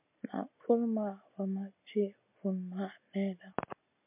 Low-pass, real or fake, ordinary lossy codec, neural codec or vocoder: 3.6 kHz; real; MP3, 32 kbps; none